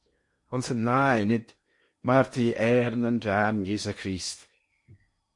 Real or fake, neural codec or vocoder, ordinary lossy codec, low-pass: fake; codec, 16 kHz in and 24 kHz out, 0.8 kbps, FocalCodec, streaming, 65536 codes; MP3, 48 kbps; 10.8 kHz